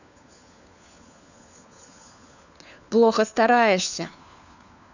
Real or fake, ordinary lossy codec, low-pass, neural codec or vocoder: fake; none; 7.2 kHz; codec, 16 kHz, 4 kbps, FunCodec, trained on LibriTTS, 50 frames a second